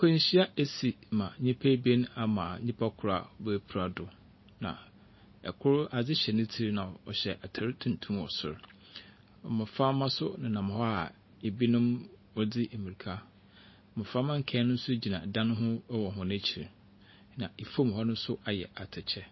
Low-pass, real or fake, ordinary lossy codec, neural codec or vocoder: 7.2 kHz; real; MP3, 24 kbps; none